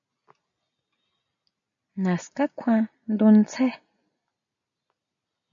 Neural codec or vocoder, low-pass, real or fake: none; 7.2 kHz; real